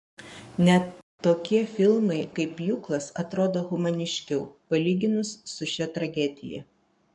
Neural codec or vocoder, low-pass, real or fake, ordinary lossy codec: codec, 44.1 kHz, 7.8 kbps, Pupu-Codec; 10.8 kHz; fake; MP3, 64 kbps